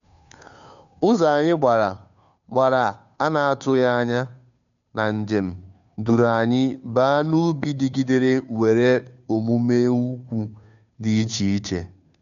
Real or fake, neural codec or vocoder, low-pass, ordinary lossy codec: fake; codec, 16 kHz, 2 kbps, FunCodec, trained on Chinese and English, 25 frames a second; 7.2 kHz; MP3, 96 kbps